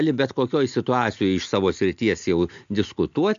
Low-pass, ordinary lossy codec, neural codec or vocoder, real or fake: 7.2 kHz; MP3, 64 kbps; none; real